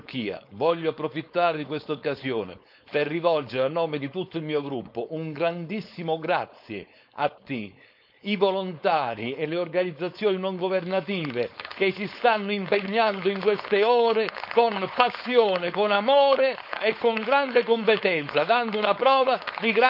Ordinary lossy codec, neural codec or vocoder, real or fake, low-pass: none; codec, 16 kHz, 4.8 kbps, FACodec; fake; 5.4 kHz